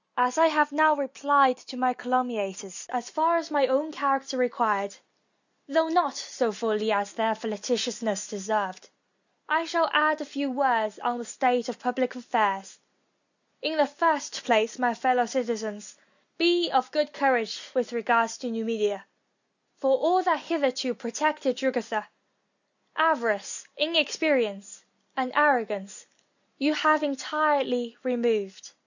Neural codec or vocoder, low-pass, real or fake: none; 7.2 kHz; real